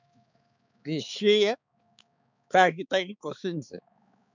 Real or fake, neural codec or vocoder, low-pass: fake; codec, 16 kHz, 4 kbps, X-Codec, HuBERT features, trained on balanced general audio; 7.2 kHz